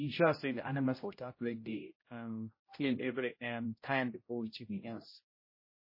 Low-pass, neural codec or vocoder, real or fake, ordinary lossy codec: 5.4 kHz; codec, 16 kHz, 0.5 kbps, X-Codec, HuBERT features, trained on general audio; fake; MP3, 24 kbps